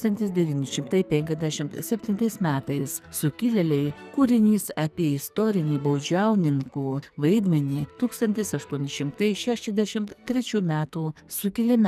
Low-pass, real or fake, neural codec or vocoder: 14.4 kHz; fake; codec, 44.1 kHz, 2.6 kbps, SNAC